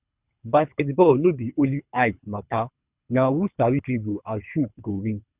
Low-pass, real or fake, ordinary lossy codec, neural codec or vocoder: 3.6 kHz; fake; Opus, 64 kbps; codec, 24 kHz, 3 kbps, HILCodec